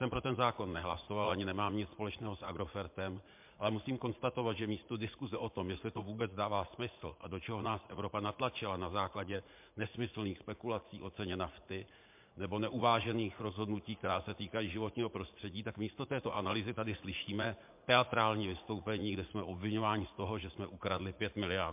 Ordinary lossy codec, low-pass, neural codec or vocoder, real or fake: MP3, 32 kbps; 3.6 kHz; vocoder, 44.1 kHz, 80 mel bands, Vocos; fake